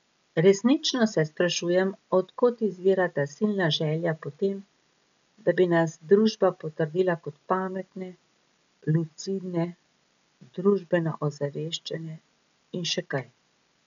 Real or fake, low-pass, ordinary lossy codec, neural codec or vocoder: real; 7.2 kHz; none; none